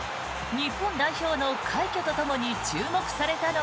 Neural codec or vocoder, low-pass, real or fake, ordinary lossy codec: none; none; real; none